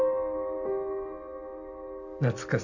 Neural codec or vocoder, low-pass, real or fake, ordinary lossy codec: none; 7.2 kHz; real; none